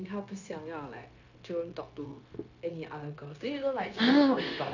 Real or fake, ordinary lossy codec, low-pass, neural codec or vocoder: fake; none; 7.2 kHz; codec, 16 kHz, 0.9 kbps, LongCat-Audio-Codec